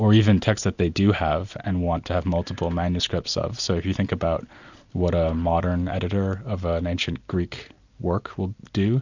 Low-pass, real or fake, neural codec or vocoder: 7.2 kHz; real; none